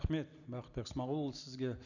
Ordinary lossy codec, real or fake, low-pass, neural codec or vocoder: none; real; 7.2 kHz; none